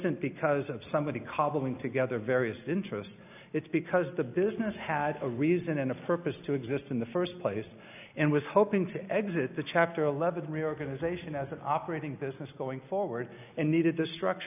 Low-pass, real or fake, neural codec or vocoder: 3.6 kHz; real; none